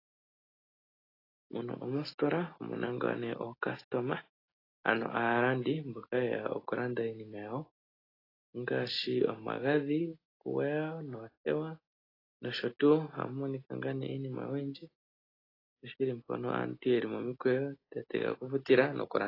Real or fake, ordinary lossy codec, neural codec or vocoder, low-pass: real; AAC, 24 kbps; none; 5.4 kHz